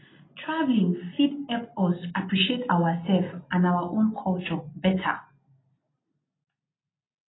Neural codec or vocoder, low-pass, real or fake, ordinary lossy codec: none; 7.2 kHz; real; AAC, 16 kbps